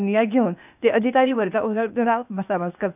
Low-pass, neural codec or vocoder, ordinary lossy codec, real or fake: 3.6 kHz; codec, 16 kHz, 0.8 kbps, ZipCodec; none; fake